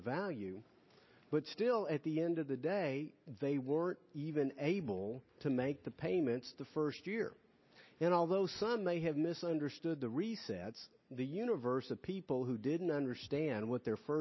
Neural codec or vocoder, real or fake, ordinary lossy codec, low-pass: none; real; MP3, 24 kbps; 7.2 kHz